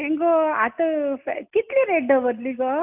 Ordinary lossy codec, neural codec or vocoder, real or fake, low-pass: none; none; real; 3.6 kHz